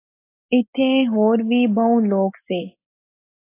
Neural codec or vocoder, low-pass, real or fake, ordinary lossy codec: none; 3.6 kHz; real; AAC, 24 kbps